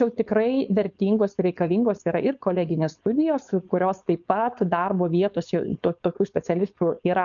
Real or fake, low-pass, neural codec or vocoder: fake; 7.2 kHz; codec, 16 kHz, 4.8 kbps, FACodec